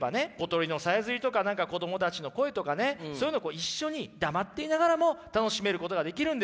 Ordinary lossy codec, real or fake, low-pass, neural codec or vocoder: none; real; none; none